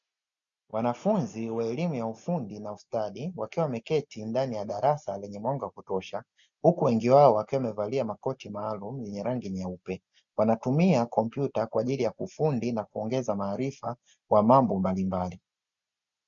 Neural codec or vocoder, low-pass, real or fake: none; 7.2 kHz; real